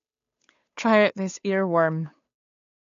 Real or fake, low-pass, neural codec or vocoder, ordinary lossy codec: fake; 7.2 kHz; codec, 16 kHz, 8 kbps, FunCodec, trained on Chinese and English, 25 frames a second; AAC, 48 kbps